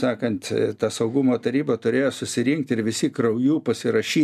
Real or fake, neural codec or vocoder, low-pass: real; none; 14.4 kHz